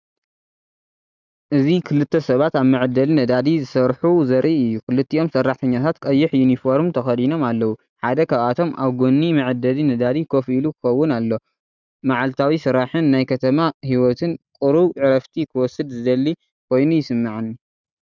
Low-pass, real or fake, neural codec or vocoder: 7.2 kHz; real; none